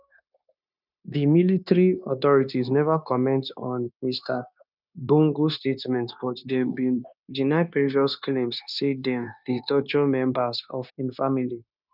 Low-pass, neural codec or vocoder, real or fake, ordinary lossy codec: 5.4 kHz; codec, 16 kHz, 0.9 kbps, LongCat-Audio-Codec; fake; none